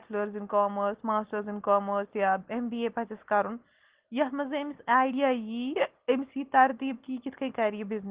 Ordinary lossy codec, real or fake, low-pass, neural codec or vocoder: Opus, 16 kbps; real; 3.6 kHz; none